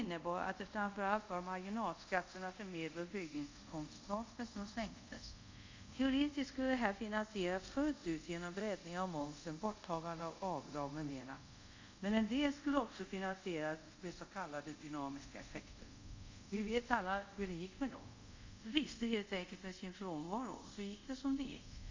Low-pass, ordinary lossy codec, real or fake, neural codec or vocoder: 7.2 kHz; MP3, 64 kbps; fake; codec, 24 kHz, 0.5 kbps, DualCodec